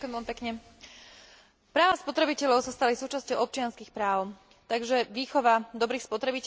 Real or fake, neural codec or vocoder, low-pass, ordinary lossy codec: real; none; none; none